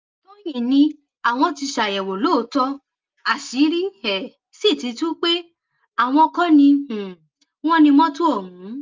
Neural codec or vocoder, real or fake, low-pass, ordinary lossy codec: none; real; 7.2 kHz; Opus, 32 kbps